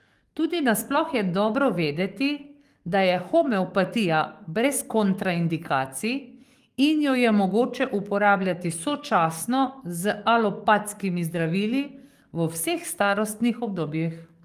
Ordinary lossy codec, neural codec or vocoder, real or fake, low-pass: Opus, 32 kbps; codec, 44.1 kHz, 7.8 kbps, DAC; fake; 14.4 kHz